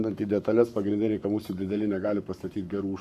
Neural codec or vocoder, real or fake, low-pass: codec, 44.1 kHz, 7.8 kbps, Pupu-Codec; fake; 14.4 kHz